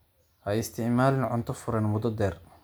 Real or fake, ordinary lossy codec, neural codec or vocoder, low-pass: real; none; none; none